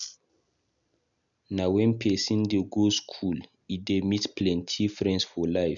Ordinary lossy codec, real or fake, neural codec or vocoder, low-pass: none; real; none; 7.2 kHz